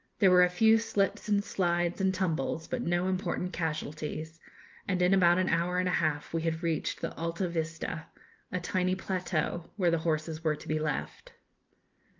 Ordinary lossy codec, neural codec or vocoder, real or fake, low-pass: Opus, 32 kbps; none; real; 7.2 kHz